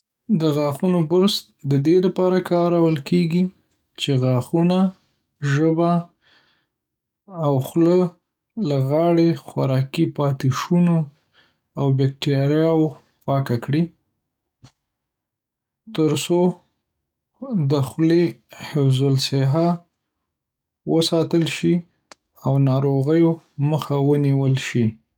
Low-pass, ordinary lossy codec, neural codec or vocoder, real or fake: 19.8 kHz; none; codec, 44.1 kHz, 7.8 kbps, DAC; fake